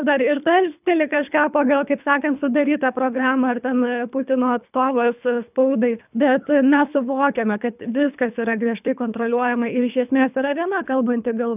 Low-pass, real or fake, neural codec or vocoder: 3.6 kHz; fake; codec, 24 kHz, 3 kbps, HILCodec